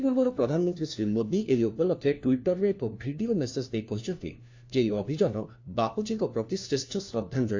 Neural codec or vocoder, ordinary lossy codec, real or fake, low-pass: codec, 16 kHz, 1 kbps, FunCodec, trained on LibriTTS, 50 frames a second; AAC, 48 kbps; fake; 7.2 kHz